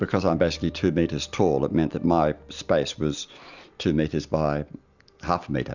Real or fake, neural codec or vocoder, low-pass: real; none; 7.2 kHz